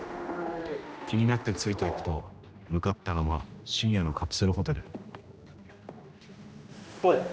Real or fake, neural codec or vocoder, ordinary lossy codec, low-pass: fake; codec, 16 kHz, 1 kbps, X-Codec, HuBERT features, trained on general audio; none; none